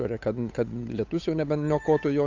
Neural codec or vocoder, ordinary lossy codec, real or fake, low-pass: codec, 16 kHz, 8 kbps, FunCodec, trained on Chinese and English, 25 frames a second; AAC, 48 kbps; fake; 7.2 kHz